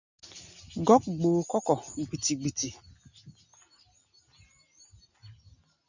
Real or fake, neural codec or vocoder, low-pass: real; none; 7.2 kHz